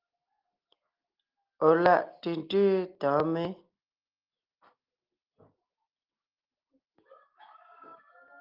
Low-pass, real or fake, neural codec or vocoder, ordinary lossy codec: 5.4 kHz; real; none; Opus, 24 kbps